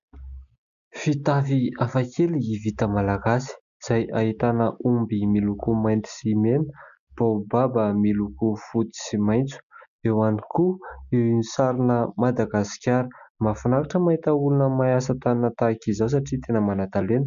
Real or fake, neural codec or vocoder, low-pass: real; none; 7.2 kHz